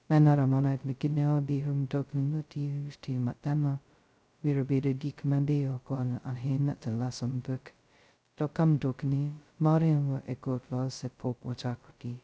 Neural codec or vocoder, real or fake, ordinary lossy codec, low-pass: codec, 16 kHz, 0.2 kbps, FocalCodec; fake; none; none